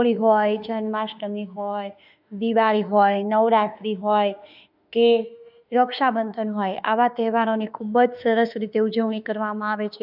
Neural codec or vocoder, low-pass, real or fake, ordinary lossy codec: autoencoder, 48 kHz, 32 numbers a frame, DAC-VAE, trained on Japanese speech; 5.4 kHz; fake; none